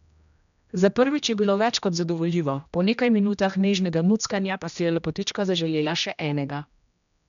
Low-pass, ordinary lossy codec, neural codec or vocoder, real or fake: 7.2 kHz; none; codec, 16 kHz, 1 kbps, X-Codec, HuBERT features, trained on general audio; fake